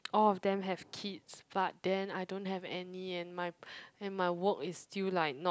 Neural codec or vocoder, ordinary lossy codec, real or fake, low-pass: none; none; real; none